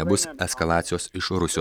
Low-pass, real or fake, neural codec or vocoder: 19.8 kHz; real; none